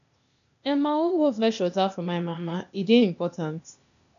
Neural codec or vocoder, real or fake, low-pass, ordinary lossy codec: codec, 16 kHz, 0.8 kbps, ZipCodec; fake; 7.2 kHz; none